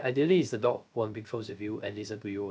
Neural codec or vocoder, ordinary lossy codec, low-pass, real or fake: codec, 16 kHz, 0.3 kbps, FocalCodec; none; none; fake